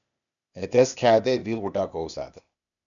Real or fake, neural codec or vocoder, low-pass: fake; codec, 16 kHz, 0.8 kbps, ZipCodec; 7.2 kHz